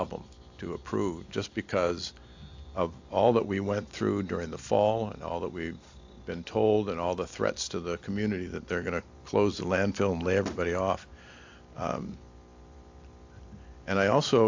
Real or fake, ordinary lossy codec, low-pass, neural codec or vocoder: real; AAC, 48 kbps; 7.2 kHz; none